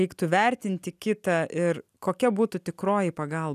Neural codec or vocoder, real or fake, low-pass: none; real; 14.4 kHz